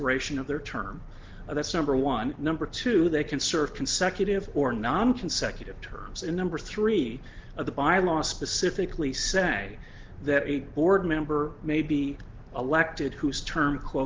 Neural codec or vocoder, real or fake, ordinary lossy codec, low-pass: none; real; Opus, 16 kbps; 7.2 kHz